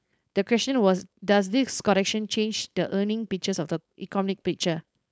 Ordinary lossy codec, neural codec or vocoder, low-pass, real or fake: none; codec, 16 kHz, 4.8 kbps, FACodec; none; fake